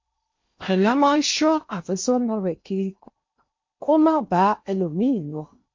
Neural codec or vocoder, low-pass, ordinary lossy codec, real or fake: codec, 16 kHz in and 24 kHz out, 0.8 kbps, FocalCodec, streaming, 65536 codes; 7.2 kHz; MP3, 48 kbps; fake